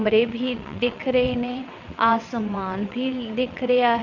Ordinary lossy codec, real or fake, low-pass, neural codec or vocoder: none; fake; 7.2 kHz; vocoder, 22.05 kHz, 80 mel bands, WaveNeXt